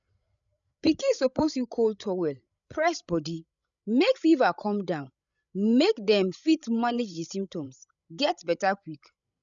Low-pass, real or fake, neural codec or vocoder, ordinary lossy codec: 7.2 kHz; fake; codec, 16 kHz, 16 kbps, FreqCodec, larger model; none